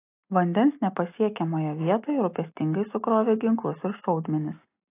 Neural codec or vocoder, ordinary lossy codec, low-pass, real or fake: none; AAC, 24 kbps; 3.6 kHz; real